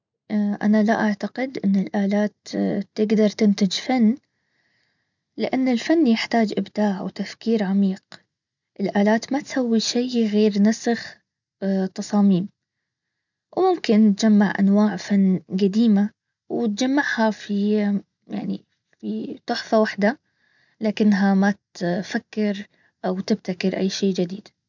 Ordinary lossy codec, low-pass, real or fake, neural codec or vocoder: none; 7.2 kHz; real; none